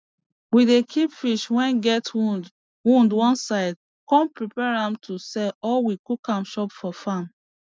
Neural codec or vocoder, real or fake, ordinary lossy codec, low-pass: none; real; none; none